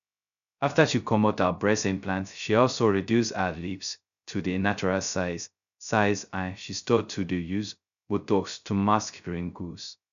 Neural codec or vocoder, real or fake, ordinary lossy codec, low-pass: codec, 16 kHz, 0.2 kbps, FocalCodec; fake; none; 7.2 kHz